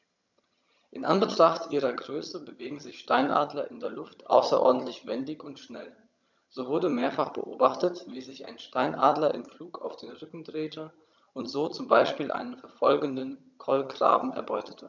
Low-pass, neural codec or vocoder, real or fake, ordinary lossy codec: 7.2 kHz; vocoder, 22.05 kHz, 80 mel bands, HiFi-GAN; fake; none